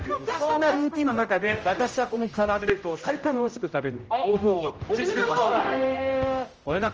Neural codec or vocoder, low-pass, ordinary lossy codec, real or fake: codec, 16 kHz, 0.5 kbps, X-Codec, HuBERT features, trained on general audio; 7.2 kHz; Opus, 24 kbps; fake